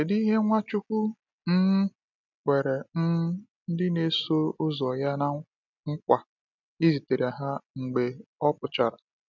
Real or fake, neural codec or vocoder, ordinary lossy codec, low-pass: real; none; none; 7.2 kHz